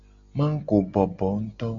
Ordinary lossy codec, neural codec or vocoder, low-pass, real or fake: AAC, 64 kbps; none; 7.2 kHz; real